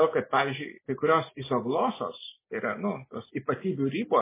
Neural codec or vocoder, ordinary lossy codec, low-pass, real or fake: none; MP3, 16 kbps; 3.6 kHz; real